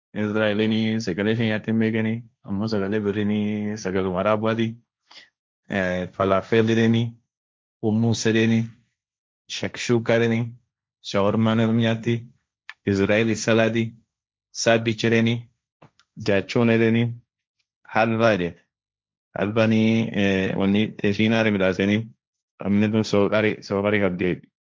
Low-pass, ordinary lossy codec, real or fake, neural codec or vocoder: none; none; fake; codec, 16 kHz, 1.1 kbps, Voila-Tokenizer